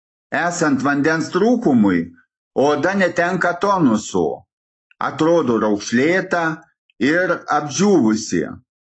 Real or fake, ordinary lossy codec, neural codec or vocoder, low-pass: real; AAC, 32 kbps; none; 9.9 kHz